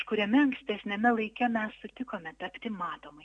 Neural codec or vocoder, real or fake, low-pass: none; real; 9.9 kHz